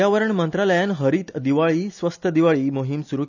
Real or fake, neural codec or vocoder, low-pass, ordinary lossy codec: real; none; 7.2 kHz; none